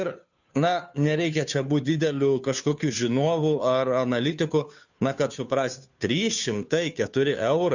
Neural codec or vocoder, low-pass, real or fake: codec, 16 kHz, 2 kbps, FunCodec, trained on Chinese and English, 25 frames a second; 7.2 kHz; fake